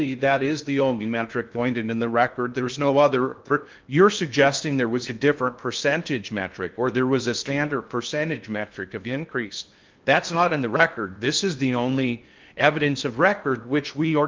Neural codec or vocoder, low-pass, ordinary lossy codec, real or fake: codec, 16 kHz in and 24 kHz out, 0.6 kbps, FocalCodec, streaming, 2048 codes; 7.2 kHz; Opus, 24 kbps; fake